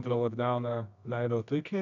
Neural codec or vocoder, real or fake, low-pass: codec, 24 kHz, 0.9 kbps, WavTokenizer, medium music audio release; fake; 7.2 kHz